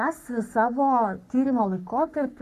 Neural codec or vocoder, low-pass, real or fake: codec, 44.1 kHz, 3.4 kbps, Pupu-Codec; 14.4 kHz; fake